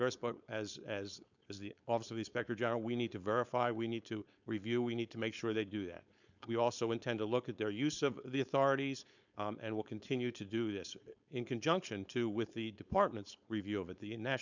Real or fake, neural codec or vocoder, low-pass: fake; codec, 16 kHz, 4.8 kbps, FACodec; 7.2 kHz